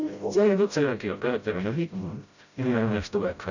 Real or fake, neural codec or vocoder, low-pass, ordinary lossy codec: fake; codec, 16 kHz, 0.5 kbps, FreqCodec, smaller model; 7.2 kHz; none